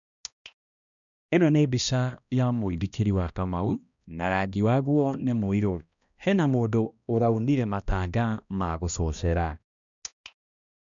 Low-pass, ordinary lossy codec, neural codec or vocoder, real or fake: 7.2 kHz; none; codec, 16 kHz, 1 kbps, X-Codec, HuBERT features, trained on balanced general audio; fake